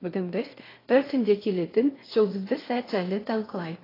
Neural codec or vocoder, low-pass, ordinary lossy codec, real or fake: codec, 16 kHz in and 24 kHz out, 0.6 kbps, FocalCodec, streaming, 2048 codes; 5.4 kHz; AAC, 24 kbps; fake